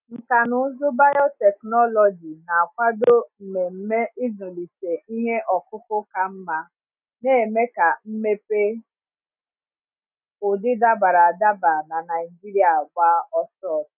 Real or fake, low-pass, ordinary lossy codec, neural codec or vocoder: real; 3.6 kHz; none; none